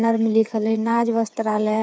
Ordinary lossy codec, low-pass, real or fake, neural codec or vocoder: none; none; fake; codec, 16 kHz, 8 kbps, FreqCodec, smaller model